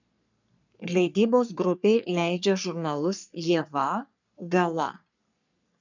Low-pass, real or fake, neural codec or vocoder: 7.2 kHz; fake; codec, 44.1 kHz, 3.4 kbps, Pupu-Codec